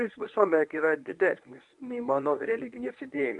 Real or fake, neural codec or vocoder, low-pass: fake; codec, 24 kHz, 0.9 kbps, WavTokenizer, medium speech release version 1; 10.8 kHz